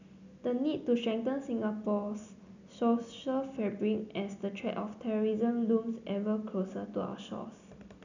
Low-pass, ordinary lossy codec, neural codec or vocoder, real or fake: 7.2 kHz; none; none; real